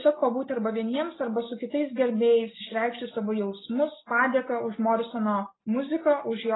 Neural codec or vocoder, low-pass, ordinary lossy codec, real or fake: none; 7.2 kHz; AAC, 16 kbps; real